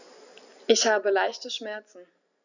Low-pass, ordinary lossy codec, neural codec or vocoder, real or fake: 7.2 kHz; none; none; real